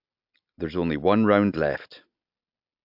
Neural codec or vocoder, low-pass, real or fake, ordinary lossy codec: none; 5.4 kHz; real; AAC, 48 kbps